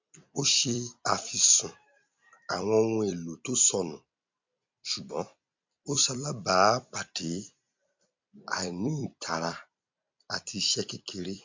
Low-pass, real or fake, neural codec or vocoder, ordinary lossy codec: 7.2 kHz; fake; vocoder, 44.1 kHz, 128 mel bands every 256 samples, BigVGAN v2; MP3, 64 kbps